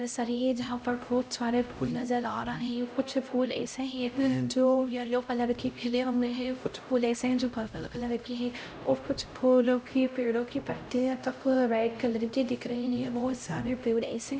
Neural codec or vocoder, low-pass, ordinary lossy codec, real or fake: codec, 16 kHz, 0.5 kbps, X-Codec, HuBERT features, trained on LibriSpeech; none; none; fake